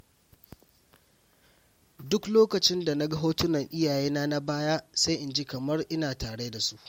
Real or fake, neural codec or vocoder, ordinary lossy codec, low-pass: real; none; MP3, 64 kbps; 19.8 kHz